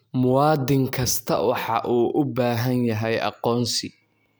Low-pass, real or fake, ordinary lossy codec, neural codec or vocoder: none; real; none; none